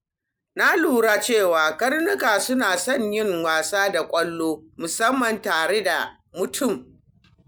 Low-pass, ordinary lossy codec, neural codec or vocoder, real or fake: none; none; none; real